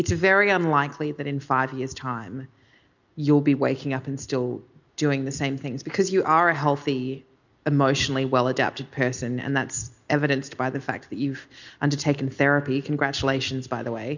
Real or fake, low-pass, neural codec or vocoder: real; 7.2 kHz; none